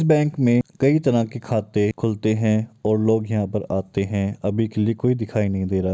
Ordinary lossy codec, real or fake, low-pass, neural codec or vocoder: none; real; none; none